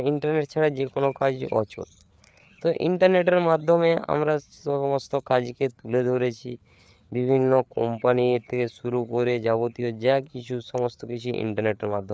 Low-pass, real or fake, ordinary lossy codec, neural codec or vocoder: none; fake; none; codec, 16 kHz, 4 kbps, FreqCodec, larger model